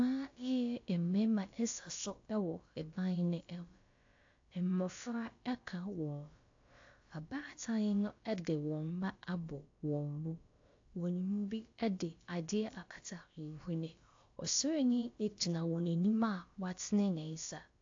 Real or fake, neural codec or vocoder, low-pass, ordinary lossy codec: fake; codec, 16 kHz, about 1 kbps, DyCAST, with the encoder's durations; 7.2 kHz; AAC, 48 kbps